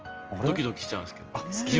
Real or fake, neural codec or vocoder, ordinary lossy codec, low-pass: real; none; Opus, 24 kbps; 7.2 kHz